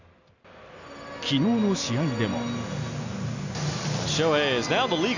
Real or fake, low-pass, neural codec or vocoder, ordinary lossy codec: real; 7.2 kHz; none; none